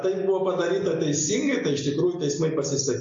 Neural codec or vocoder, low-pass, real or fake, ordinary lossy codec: none; 7.2 kHz; real; AAC, 48 kbps